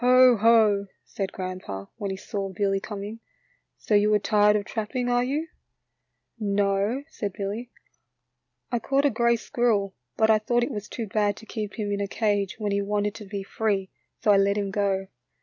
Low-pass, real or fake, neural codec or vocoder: 7.2 kHz; real; none